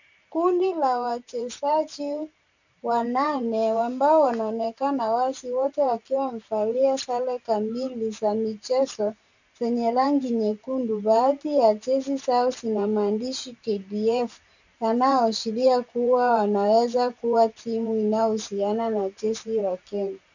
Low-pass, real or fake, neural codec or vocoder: 7.2 kHz; fake; vocoder, 44.1 kHz, 128 mel bands every 512 samples, BigVGAN v2